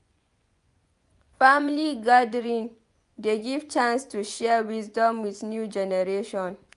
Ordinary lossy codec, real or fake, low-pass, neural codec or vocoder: none; fake; 10.8 kHz; vocoder, 24 kHz, 100 mel bands, Vocos